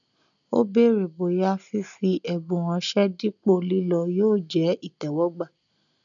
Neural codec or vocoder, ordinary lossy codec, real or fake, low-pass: none; none; real; 7.2 kHz